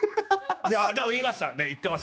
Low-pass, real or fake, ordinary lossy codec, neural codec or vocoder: none; fake; none; codec, 16 kHz, 2 kbps, X-Codec, HuBERT features, trained on general audio